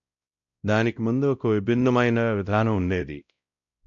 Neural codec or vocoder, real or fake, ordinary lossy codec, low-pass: codec, 16 kHz, 0.5 kbps, X-Codec, WavLM features, trained on Multilingual LibriSpeech; fake; none; 7.2 kHz